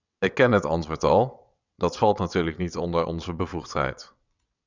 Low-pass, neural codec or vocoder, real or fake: 7.2 kHz; vocoder, 22.05 kHz, 80 mel bands, WaveNeXt; fake